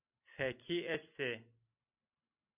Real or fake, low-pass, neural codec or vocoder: real; 3.6 kHz; none